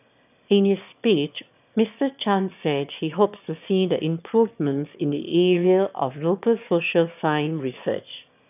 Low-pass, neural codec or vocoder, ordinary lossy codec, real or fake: 3.6 kHz; autoencoder, 22.05 kHz, a latent of 192 numbers a frame, VITS, trained on one speaker; none; fake